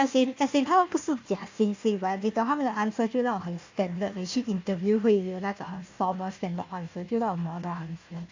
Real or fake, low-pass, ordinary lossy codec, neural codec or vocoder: fake; 7.2 kHz; AAC, 48 kbps; codec, 16 kHz, 1 kbps, FunCodec, trained on Chinese and English, 50 frames a second